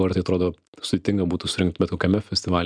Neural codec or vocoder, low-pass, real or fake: none; 9.9 kHz; real